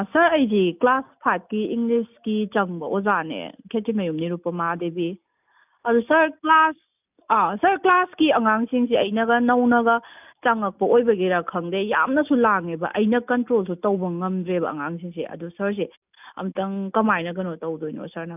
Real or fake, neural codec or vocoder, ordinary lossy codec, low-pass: real; none; none; 3.6 kHz